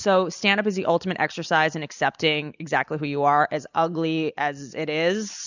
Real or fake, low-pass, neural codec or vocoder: real; 7.2 kHz; none